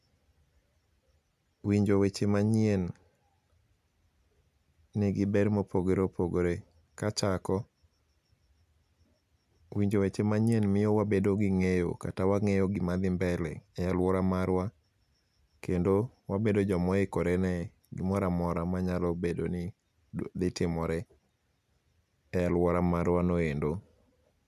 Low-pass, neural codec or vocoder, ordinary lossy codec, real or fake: 14.4 kHz; none; none; real